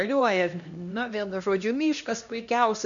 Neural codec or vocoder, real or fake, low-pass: codec, 16 kHz, 1 kbps, X-Codec, HuBERT features, trained on LibriSpeech; fake; 7.2 kHz